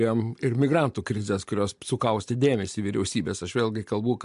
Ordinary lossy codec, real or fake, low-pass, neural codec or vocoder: MP3, 64 kbps; real; 10.8 kHz; none